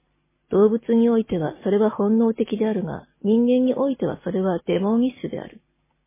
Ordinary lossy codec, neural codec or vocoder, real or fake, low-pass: MP3, 16 kbps; none; real; 3.6 kHz